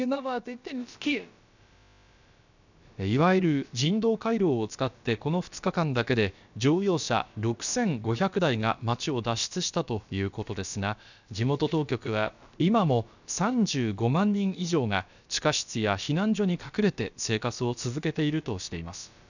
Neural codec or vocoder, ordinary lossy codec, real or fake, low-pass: codec, 16 kHz, about 1 kbps, DyCAST, with the encoder's durations; none; fake; 7.2 kHz